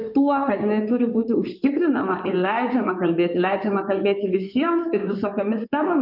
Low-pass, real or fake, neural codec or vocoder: 5.4 kHz; fake; codec, 16 kHz in and 24 kHz out, 2.2 kbps, FireRedTTS-2 codec